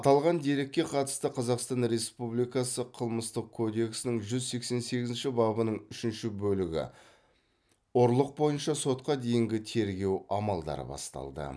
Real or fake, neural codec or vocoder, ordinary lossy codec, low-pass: real; none; none; none